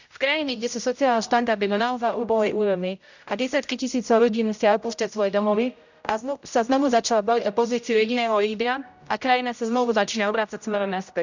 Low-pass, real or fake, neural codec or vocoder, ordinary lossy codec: 7.2 kHz; fake; codec, 16 kHz, 0.5 kbps, X-Codec, HuBERT features, trained on general audio; none